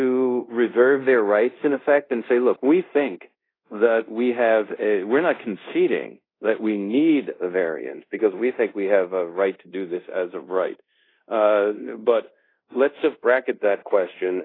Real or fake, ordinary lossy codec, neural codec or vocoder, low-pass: fake; AAC, 24 kbps; codec, 24 kHz, 0.5 kbps, DualCodec; 5.4 kHz